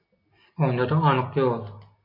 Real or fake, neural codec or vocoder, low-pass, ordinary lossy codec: real; none; 5.4 kHz; MP3, 32 kbps